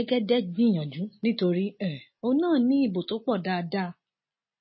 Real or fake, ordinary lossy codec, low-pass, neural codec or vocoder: real; MP3, 24 kbps; 7.2 kHz; none